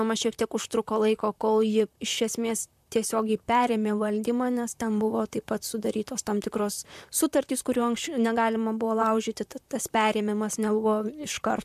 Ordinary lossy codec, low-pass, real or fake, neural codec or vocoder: MP3, 96 kbps; 14.4 kHz; fake; vocoder, 44.1 kHz, 128 mel bands, Pupu-Vocoder